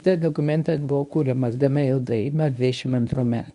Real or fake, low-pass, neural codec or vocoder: fake; 10.8 kHz; codec, 24 kHz, 0.9 kbps, WavTokenizer, medium speech release version 1